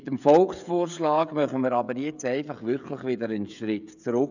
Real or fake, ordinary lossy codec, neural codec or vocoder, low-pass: fake; none; codec, 16 kHz, 16 kbps, FreqCodec, smaller model; 7.2 kHz